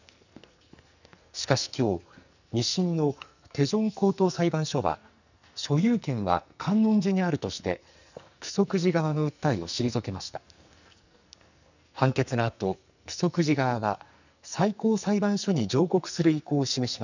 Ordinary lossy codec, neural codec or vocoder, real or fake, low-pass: none; codec, 44.1 kHz, 2.6 kbps, SNAC; fake; 7.2 kHz